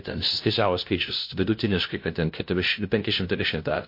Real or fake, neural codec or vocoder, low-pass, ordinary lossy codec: fake; codec, 16 kHz, 0.5 kbps, FunCodec, trained on LibriTTS, 25 frames a second; 5.4 kHz; MP3, 32 kbps